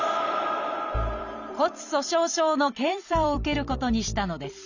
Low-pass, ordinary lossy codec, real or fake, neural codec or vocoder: 7.2 kHz; none; fake; vocoder, 44.1 kHz, 128 mel bands every 256 samples, BigVGAN v2